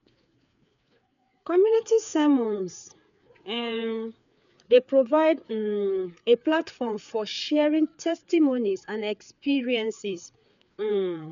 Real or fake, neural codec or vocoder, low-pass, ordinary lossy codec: fake; codec, 16 kHz, 4 kbps, FreqCodec, larger model; 7.2 kHz; none